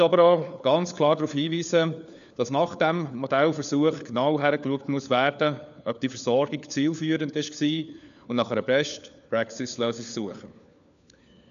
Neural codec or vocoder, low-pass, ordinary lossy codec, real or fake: codec, 16 kHz, 4 kbps, FunCodec, trained on LibriTTS, 50 frames a second; 7.2 kHz; none; fake